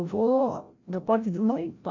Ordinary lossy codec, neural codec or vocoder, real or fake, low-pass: MP3, 48 kbps; codec, 16 kHz, 0.5 kbps, FreqCodec, larger model; fake; 7.2 kHz